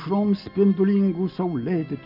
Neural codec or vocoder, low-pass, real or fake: none; 5.4 kHz; real